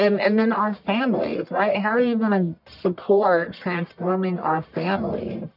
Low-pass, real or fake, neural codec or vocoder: 5.4 kHz; fake; codec, 44.1 kHz, 1.7 kbps, Pupu-Codec